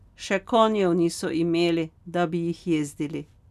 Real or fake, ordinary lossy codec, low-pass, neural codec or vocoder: real; none; 14.4 kHz; none